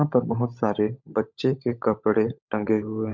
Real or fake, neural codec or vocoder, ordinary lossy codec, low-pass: fake; codec, 16 kHz, 16 kbps, FunCodec, trained on LibriTTS, 50 frames a second; MP3, 48 kbps; 7.2 kHz